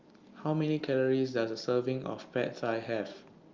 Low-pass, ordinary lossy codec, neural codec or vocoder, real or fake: 7.2 kHz; Opus, 24 kbps; none; real